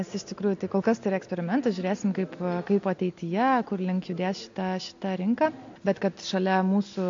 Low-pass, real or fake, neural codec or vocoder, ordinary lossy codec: 7.2 kHz; real; none; AAC, 48 kbps